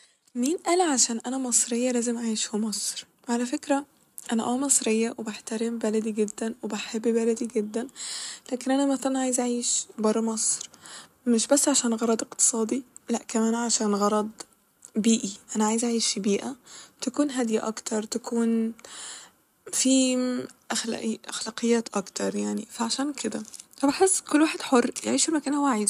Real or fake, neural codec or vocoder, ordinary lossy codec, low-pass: real; none; none; 14.4 kHz